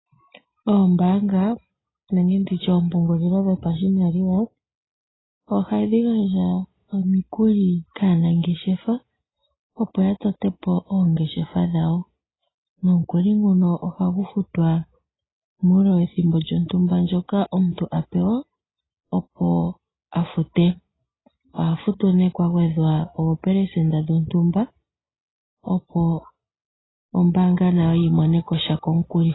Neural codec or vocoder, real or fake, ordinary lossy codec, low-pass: none; real; AAC, 16 kbps; 7.2 kHz